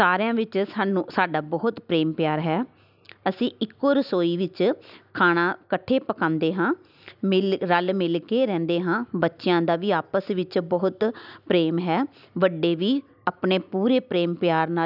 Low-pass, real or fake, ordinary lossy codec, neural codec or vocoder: 5.4 kHz; real; none; none